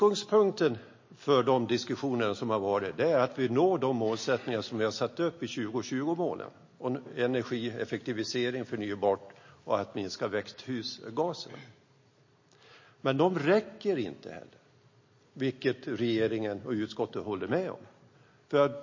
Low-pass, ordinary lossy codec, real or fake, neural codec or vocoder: 7.2 kHz; MP3, 32 kbps; real; none